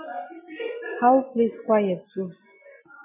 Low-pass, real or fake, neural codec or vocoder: 3.6 kHz; real; none